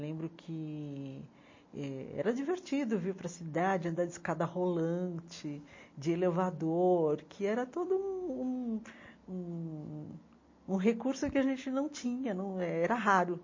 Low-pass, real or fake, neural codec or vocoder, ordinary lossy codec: 7.2 kHz; real; none; MP3, 32 kbps